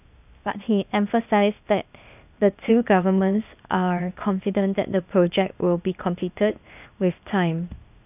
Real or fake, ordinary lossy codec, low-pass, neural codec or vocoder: fake; none; 3.6 kHz; codec, 16 kHz, 0.8 kbps, ZipCodec